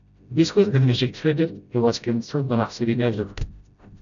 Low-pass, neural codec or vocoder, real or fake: 7.2 kHz; codec, 16 kHz, 0.5 kbps, FreqCodec, smaller model; fake